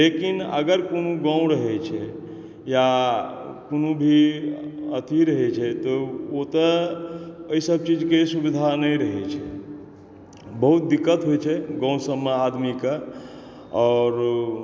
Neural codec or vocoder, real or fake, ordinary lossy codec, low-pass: none; real; none; none